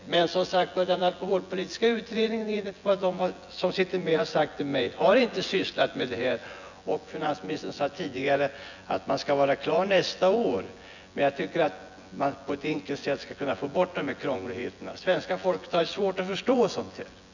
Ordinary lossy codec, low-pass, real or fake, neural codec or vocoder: none; 7.2 kHz; fake; vocoder, 24 kHz, 100 mel bands, Vocos